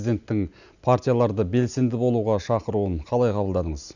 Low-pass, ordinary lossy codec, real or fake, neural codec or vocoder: 7.2 kHz; none; real; none